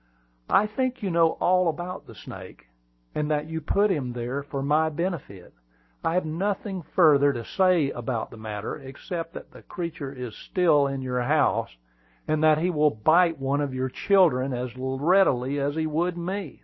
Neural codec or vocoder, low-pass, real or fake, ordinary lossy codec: none; 7.2 kHz; real; MP3, 24 kbps